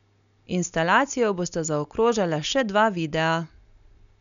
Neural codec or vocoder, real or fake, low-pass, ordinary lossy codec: none; real; 7.2 kHz; none